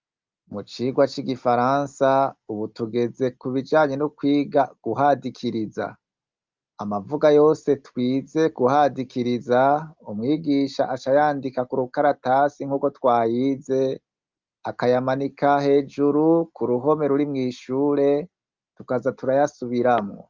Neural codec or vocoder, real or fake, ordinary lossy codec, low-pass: none; real; Opus, 24 kbps; 7.2 kHz